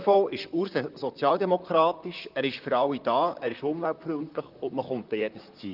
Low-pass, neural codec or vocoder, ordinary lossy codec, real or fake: 5.4 kHz; vocoder, 44.1 kHz, 128 mel bands, Pupu-Vocoder; Opus, 32 kbps; fake